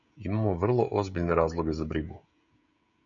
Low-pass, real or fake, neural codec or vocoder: 7.2 kHz; fake; codec, 16 kHz, 16 kbps, FreqCodec, smaller model